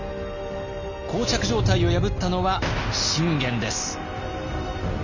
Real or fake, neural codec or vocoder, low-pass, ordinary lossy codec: real; none; 7.2 kHz; none